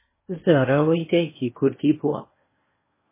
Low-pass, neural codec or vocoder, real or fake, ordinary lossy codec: 3.6 kHz; codec, 16 kHz in and 24 kHz out, 0.8 kbps, FocalCodec, streaming, 65536 codes; fake; MP3, 16 kbps